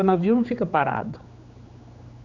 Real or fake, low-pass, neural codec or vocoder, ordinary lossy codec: fake; 7.2 kHz; codec, 16 kHz, 4 kbps, X-Codec, HuBERT features, trained on general audio; none